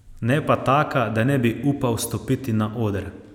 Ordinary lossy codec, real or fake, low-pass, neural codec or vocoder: none; real; 19.8 kHz; none